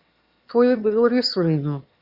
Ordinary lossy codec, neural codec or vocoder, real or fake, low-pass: Opus, 64 kbps; autoencoder, 22.05 kHz, a latent of 192 numbers a frame, VITS, trained on one speaker; fake; 5.4 kHz